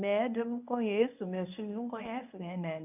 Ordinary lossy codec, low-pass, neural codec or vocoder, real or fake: none; 3.6 kHz; codec, 24 kHz, 0.9 kbps, WavTokenizer, medium speech release version 1; fake